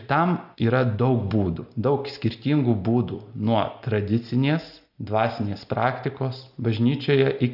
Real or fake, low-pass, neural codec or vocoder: real; 5.4 kHz; none